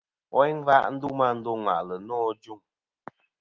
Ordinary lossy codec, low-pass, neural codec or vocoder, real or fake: Opus, 24 kbps; 7.2 kHz; none; real